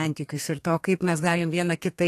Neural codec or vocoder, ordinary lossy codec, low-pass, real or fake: codec, 32 kHz, 1.9 kbps, SNAC; AAC, 64 kbps; 14.4 kHz; fake